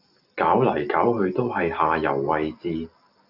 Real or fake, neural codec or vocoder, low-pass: real; none; 5.4 kHz